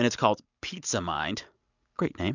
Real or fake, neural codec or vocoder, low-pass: real; none; 7.2 kHz